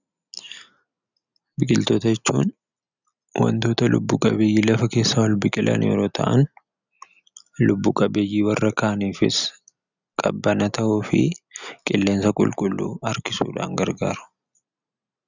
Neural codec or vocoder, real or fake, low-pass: none; real; 7.2 kHz